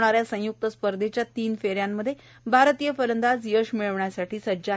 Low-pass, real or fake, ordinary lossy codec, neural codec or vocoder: none; real; none; none